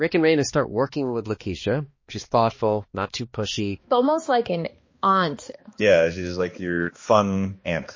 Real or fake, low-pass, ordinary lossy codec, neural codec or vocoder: fake; 7.2 kHz; MP3, 32 kbps; codec, 16 kHz, 2 kbps, X-Codec, HuBERT features, trained on balanced general audio